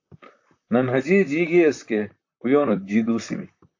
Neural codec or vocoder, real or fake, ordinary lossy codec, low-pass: vocoder, 44.1 kHz, 128 mel bands, Pupu-Vocoder; fake; AAC, 48 kbps; 7.2 kHz